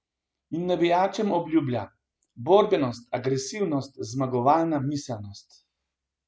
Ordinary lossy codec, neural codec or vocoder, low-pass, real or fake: none; none; none; real